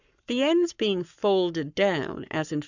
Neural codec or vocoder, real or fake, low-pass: codec, 44.1 kHz, 7.8 kbps, Pupu-Codec; fake; 7.2 kHz